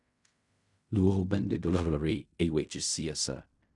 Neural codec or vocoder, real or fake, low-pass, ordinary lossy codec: codec, 16 kHz in and 24 kHz out, 0.4 kbps, LongCat-Audio-Codec, fine tuned four codebook decoder; fake; 10.8 kHz; none